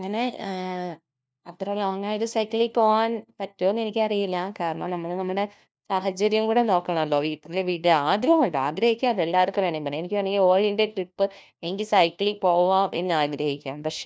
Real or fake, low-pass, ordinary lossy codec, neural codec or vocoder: fake; none; none; codec, 16 kHz, 1 kbps, FunCodec, trained on LibriTTS, 50 frames a second